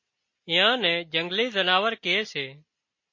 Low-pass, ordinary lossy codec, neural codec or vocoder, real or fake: 7.2 kHz; MP3, 32 kbps; none; real